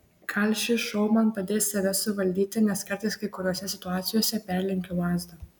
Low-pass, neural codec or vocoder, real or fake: 19.8 kHz; none; real